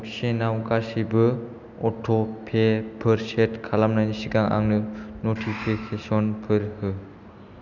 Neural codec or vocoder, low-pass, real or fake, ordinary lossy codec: none; 7.2 kHz; real; none